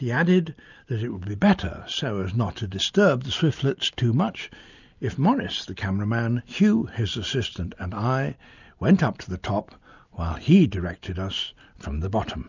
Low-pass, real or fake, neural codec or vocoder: 7.2 kHz; real; none